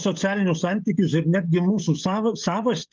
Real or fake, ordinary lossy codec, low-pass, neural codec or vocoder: fake; Opus, 32 kbps; 7.2 kHz; codec, 16 kHz, 16 kbps, FreqCodec, smaller model